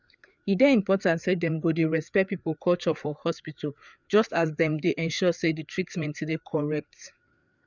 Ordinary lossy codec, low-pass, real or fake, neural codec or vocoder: none; 7.2 kHz; fake; codec, 16 kHz, 4 kbps, FreqCodec, larger model